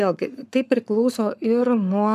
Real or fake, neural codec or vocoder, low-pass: fake; codec, 44.1 kHz, 7.8 kbps, Pupu-Codec; 14.4 kHz